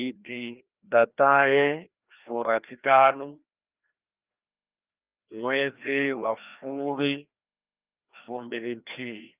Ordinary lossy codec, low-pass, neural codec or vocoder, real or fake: Opus, 24 kbps; 3.6 kHz; codec, 16 kHz, 1 kbps, FreqCodec, larger model; fake